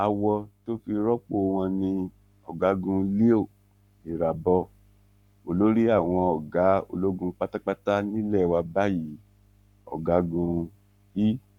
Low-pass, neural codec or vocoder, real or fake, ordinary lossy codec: 19.8 kHz; codec, 44.1 kHz, 7.8 kbps, Pupu-Codec; fake; none